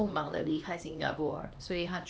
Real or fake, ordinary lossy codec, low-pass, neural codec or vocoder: fake; none; none; codec, 16 kHz, 2 kbps, X-Codec, HuBERT features, trained on LibriSpeech